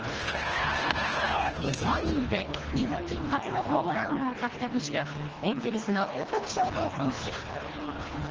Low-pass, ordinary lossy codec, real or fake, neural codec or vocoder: 7.2 kHz; Opus, 16 kbps; fake; codec, 24 kHz, 1.5 kbps, HILCodec